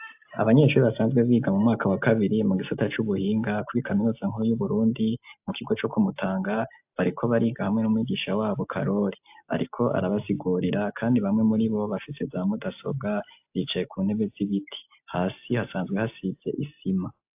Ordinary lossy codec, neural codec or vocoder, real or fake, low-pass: AAC, 32 kbps; none; real; 3.6 kHz